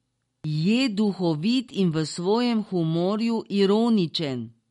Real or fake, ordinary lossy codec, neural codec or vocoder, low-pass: real; MP3, 48 kbps; none; 19.8 kHz